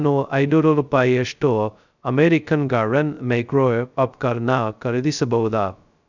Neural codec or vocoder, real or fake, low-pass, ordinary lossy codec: codec, 16 kHz, 0.2 kbps, FocalCodec; fake; 7.2 kHz; none